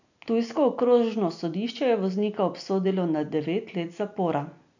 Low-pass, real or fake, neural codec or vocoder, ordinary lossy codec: 7.2 kHz; real; none; none